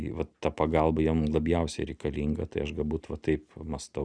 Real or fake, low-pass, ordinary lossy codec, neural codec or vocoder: real; 9.9 kHz; Opus, 64 kbps; none